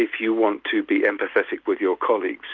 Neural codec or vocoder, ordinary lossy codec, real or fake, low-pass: none; Opus, 32 kbps; real; 7.2 kHz